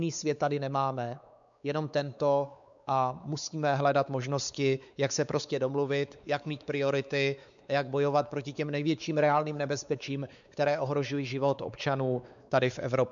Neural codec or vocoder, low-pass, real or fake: codec, 16 kHz, 4 kbps, X-Codec, WavLM features, trained on Multilingual LibriSpeech; 7.2 kHz; fake